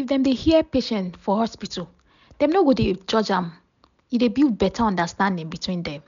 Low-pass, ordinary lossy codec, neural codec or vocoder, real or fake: 7.2 kHz; MP3, 96 kbps; none; real